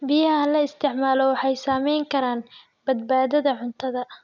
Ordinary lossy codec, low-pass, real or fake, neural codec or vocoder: none; 7.2 kHz; real; none